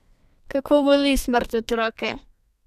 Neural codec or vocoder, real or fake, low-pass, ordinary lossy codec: codec, 32 kHz, 1.9 kbps, SNAC; fake; 14.4 kHz; none